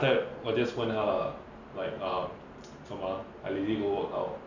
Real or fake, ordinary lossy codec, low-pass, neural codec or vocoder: real; none; 7.2 kHz; none